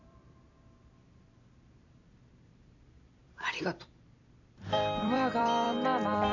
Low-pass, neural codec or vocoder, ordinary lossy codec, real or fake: 7.2 kHz; vocoder, 44.1 kHz, 128 mel bands every 256 samples, BigVGAN v2; none; fake